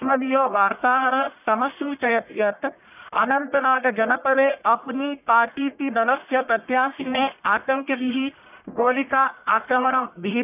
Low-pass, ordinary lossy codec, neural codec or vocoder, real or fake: 3.6 kHz; none; codec, 44.1 kHz, 1.7 kbps, Pupu-Codec; fake